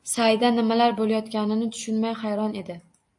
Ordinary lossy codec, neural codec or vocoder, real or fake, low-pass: AAC, 64 kbps; none; real; 10.8 kHz